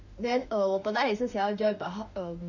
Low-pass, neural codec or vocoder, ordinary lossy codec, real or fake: 7.2 kHz; autoencoder, 48 kHz, 32 numbers a frame, DAC-VAE, trained on Japanese speech; none; fake